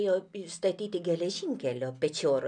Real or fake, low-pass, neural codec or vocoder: real; 9.9 kHz; none